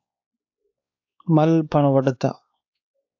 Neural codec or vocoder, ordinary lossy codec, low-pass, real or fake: codec, 16 kHz, 2 kbps, X-Codec, WavLM features, trained on Multilingual LibriSpeech; AAC, 48 kbps; 7.2 kHz; fake